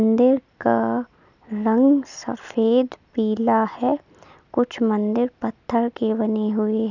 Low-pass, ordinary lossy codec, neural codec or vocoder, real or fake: 7.2 kHz; none; none; real